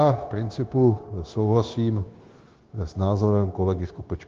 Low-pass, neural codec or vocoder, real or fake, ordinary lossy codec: 7.2 kHz; codec, 16 kHz, 0.9 kbps, LongCat-Audio-Codec; fake; Opus, 16 kbps